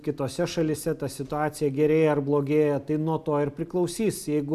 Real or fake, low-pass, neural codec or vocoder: real; 14.4 kHz; none